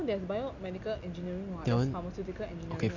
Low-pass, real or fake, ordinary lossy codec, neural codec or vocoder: 7.2 kHz; real; none; none